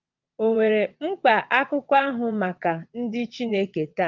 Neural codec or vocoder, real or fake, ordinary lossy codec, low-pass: vocoder, 22.05 kHz, 80 mel bands, WaveNeXt; fake; Opus, 32 kbps; 7.2 kHz